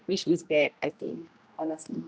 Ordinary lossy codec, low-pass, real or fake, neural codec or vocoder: none; none; fake; codec, 16 kHz, 1 kbps, X-Codec, HuBERT features, trained on general audio